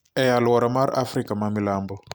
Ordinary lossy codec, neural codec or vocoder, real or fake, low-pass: none; none; real; none